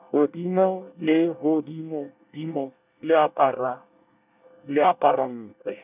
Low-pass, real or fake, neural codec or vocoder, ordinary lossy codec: 3.6 kHz; fake; codec, 24 kHz, 1 kbps, SNAC; none